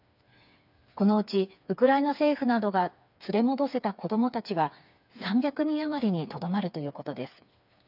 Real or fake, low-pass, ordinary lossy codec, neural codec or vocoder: fake; 5.4 kHz; none; codec, 16 kHz, 4 kbps, FreqCodec, smaller model